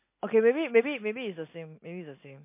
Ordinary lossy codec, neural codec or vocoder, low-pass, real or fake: MP3, 24 kbps; none; 3.6 kHz; real